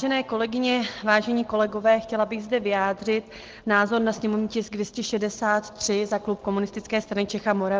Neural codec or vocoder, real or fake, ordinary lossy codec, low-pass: none; real; Opus, 16 kbps; 7.2 kHz